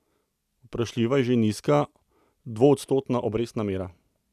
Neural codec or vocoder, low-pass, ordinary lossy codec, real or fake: none; 14.4 kHz; none; real